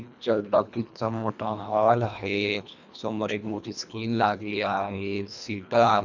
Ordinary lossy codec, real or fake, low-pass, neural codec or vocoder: none; fake; 7.2 kHz; codec, 24 kHz, 1.5 kbps, HILCodec